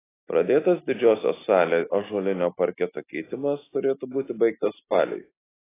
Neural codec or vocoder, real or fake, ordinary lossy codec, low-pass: none; real; AAC, 16 kbps; 3.6 kHz